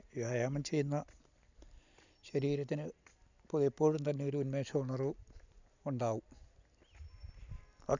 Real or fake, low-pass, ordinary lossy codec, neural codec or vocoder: real; 7.2 kHz; none; none